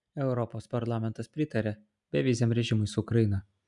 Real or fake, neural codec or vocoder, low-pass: real; none; 10.8 kHz